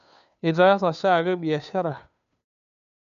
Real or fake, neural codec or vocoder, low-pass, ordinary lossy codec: fake; codec, 16 kHz, 2 kbps, FunCodec, trained on Chinese and English, 25 frames a second; 7.2 kHz; none